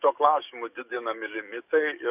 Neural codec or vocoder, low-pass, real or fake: codec, 44.1 kHz, 7.8 kbps, DAC; 3.6 kHz; fake